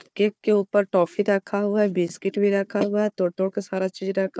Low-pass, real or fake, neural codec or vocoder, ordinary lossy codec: none; fake; codec, 16 kHz, 4 kbps, FunCodec, trained on Chinese and English, 50 frames a second; none